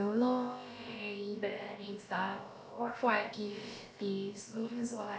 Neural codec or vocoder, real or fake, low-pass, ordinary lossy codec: codec, 16 kHz, about 1 kbps, DyCAST, with the encoder's durations; fake; none; none